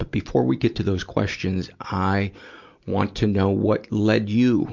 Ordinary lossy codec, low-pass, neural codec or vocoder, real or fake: MP3, 64 kbps; 7.2 kHz; none; real